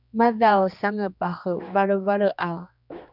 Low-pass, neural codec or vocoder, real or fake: 5.4 kHz; codec, 16 kHz, 2 kbps, X-Codec, HuBERT features, trained on general audio; fake